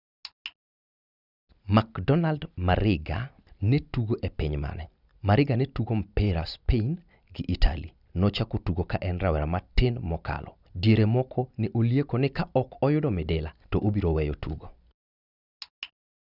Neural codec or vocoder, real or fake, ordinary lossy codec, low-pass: none; real; none; 5.4 kHz